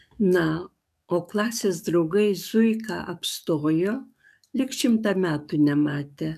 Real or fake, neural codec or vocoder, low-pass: fake; codec, 44.1 kHz, 7.8 kbps, DAC; 14.4 kHz